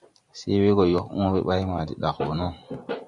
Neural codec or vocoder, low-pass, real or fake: vocoder, 44.1 kHz, 128 mel bands every 512 samples, BigVGAN v2; 10.8 kHz; fake